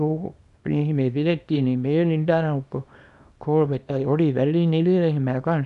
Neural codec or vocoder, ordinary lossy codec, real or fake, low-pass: codec, 24 kHz, 0.9 kbps, WavTokenizer, small release; none; fake; 10.8 kHz